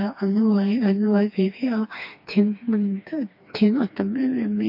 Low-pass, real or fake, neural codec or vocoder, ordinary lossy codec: 5.4 kHz; fake; codec, 16 kHz, 2 kbps, FreqCodec, smaller model; MP3, 32 kbps